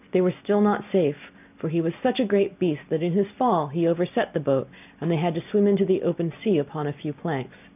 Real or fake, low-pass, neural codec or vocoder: real; 3.6 kHz; none